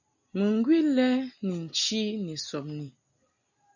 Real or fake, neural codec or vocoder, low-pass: real; none; 7.2 kHz